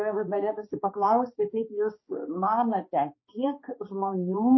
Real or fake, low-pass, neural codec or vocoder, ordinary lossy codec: fake; 7.2 kHz; codec, 16 kHz, 2 kbps, X-Codec, HuBERT features, trained on balanced general audio; MP3, 24 kbps